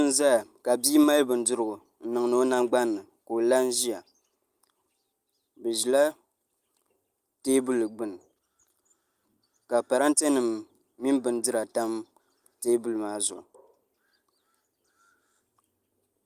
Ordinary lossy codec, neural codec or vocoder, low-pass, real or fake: Opus, 32 kbps; none; 14.4 kHz; real